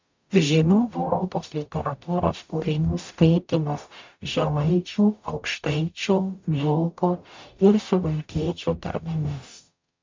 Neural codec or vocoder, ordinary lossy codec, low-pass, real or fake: codec, 44.1 kHz, 0.9 kbps, DAC; MP3, 64 kbps; 7.2 kHz; fake